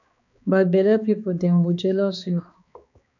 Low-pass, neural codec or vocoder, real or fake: 7.2 kHz; codec, 16 kHz, 2 kbps, X-Codec, HuBERT features, trained on balanced general audio; fake